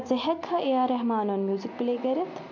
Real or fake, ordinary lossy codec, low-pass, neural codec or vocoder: real; MP3, 64 kbps; 7.2 kHz; none